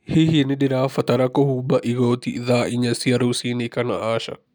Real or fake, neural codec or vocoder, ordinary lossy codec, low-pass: real; none; none; none